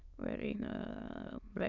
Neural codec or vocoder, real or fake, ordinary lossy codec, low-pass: autoencoder, 22.05 kHz, a latent of 192 numbers a frame, VITS, trained on many speakers; fake; none; 7.2 kHz